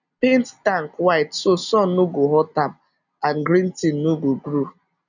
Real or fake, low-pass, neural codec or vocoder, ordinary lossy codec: real; 7.2 kHz; none; none